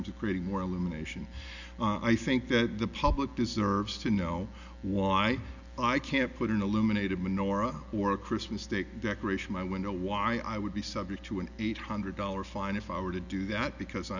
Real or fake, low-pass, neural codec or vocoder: real; 7.2 kHz; none